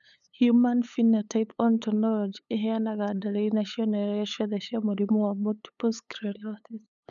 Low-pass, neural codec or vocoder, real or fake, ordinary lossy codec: 7.2 kHz; codec, 16 kHz, 8 kbps, FunCodec, trained on LibriTTS, 25 frames a second; fake; none